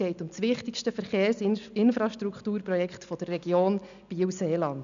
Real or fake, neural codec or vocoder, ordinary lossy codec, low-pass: real; none; none; 7.2 kHz